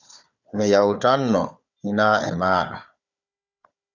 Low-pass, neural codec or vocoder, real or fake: 7.2 kHz; codec, 16 kHz, 4 kbps, FunCodec, trained on Chinese and English, 50 frames a second; fake